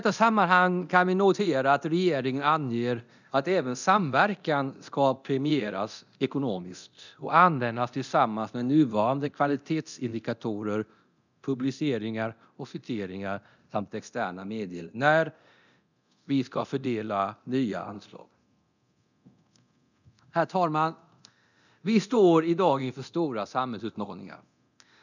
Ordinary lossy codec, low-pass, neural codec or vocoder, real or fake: none; 7.2 kHz; codec, 24 kHz, 0.9 kbps, DualCodec; fake